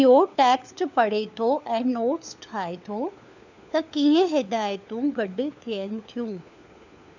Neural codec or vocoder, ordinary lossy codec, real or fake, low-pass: codec, 16 kHz, 4 kbps, FunCodec, trained on LibriTTS, 50 frames a second; none; fake; 7.2 kHz